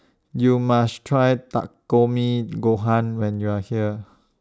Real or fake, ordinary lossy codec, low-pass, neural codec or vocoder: real; none; none; none